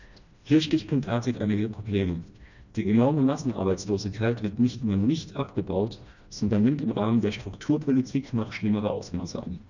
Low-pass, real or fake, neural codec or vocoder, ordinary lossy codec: 7.2 kHz; fake; codec, 16 kHz, 1 kbps, FreqCodec, smaller model; none